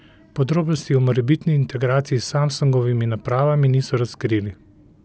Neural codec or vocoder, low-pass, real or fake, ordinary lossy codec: none; none; real; none